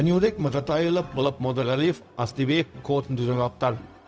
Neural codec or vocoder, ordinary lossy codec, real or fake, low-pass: codec, 16 kHz, 0.4 kbps, LongCat-Audio-Codec; none; fake; none